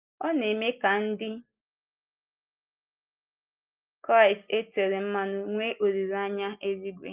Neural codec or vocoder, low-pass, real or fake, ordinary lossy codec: none; 3.6 kHz; real; Opus, 24 kbps